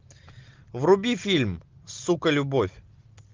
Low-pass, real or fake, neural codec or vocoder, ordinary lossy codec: 7.2 kHz; real; none; Opus, 24 kbps